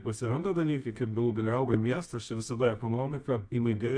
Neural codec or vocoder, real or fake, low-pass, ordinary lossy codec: codec, 24 kHz, 0.9 kbps, WavTokenizer, medium music audio release; fake; 9.9 kHz; AAC, 64 kbps